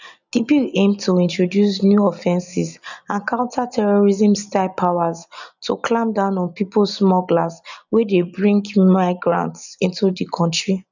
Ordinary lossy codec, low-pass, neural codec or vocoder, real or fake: none; 7.2 kHz; none; real